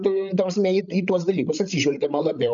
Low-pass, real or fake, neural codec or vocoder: 7.2 kHz; fake; codec, 16 kHz, 8 kbps, FreqCodec, larger model